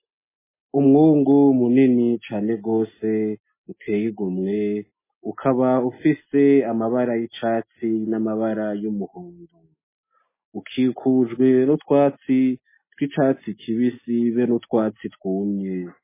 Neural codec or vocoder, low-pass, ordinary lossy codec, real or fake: none; 3.6 kHz; MP3, 16 kbps; real